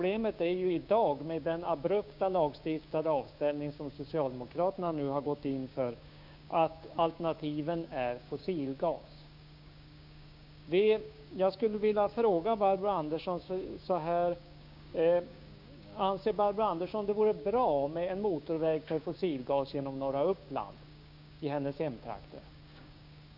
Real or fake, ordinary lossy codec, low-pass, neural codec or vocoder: fake; none; 5.4 kHz; codec, 16 kHz, 6 kbps, DAC